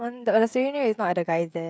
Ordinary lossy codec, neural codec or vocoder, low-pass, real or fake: none; codec, 16 kHz, 16 kbps, FreqCodec, smaller model; none; fake